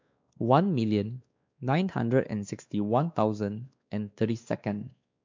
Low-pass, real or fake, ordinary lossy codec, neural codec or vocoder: 7.2 kHz; fake; MP3, 64 kbps; codec, 16 kHz, 2 kbps, X-Codec, WavLM features, trained on Multilingual LibriSpeech